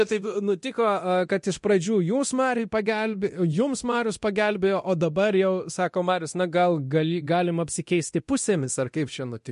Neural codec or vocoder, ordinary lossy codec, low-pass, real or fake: codec, 24 kHz, 0.9 kbps, DualCodec; MP3, 48 kbps; 10.8 kHz; fake